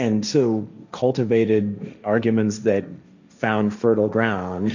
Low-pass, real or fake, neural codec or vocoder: 7.2 kHz; fake; codec, 16 kHz, 1.1 kbps, Voila-Tokenizer